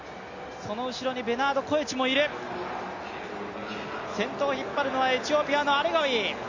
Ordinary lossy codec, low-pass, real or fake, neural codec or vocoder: Opus, 64 kbps; 7.2 kHz; real; none